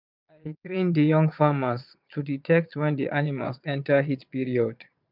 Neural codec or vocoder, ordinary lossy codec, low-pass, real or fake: vocoder, 22.05 kHz, 80 mel bands, Vocos; none; 5.4 kHz; fake